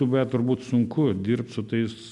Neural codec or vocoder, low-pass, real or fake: none; 10.8 kHz; real